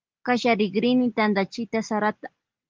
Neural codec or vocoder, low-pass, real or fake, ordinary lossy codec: none; 7.2 kHz; real; Opus, 32 kbps